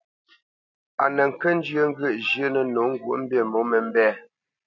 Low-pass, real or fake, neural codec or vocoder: 7.2 kHz; real; none